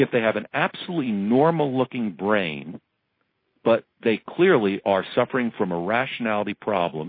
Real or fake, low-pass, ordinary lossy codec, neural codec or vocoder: real; 5.4 kHz; MP3, 24 kbps; none